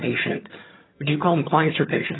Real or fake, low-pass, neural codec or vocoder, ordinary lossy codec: fake; 7.2 kHz; vocoder, 22.05 kHz, 80 mel bands, HiFi-GAN; AAC, 16 kbps